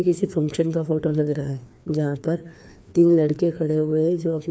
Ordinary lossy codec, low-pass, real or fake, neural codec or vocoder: none; none; fake; codec, 16 kHz, 2 kbps, FreqCodec, larger model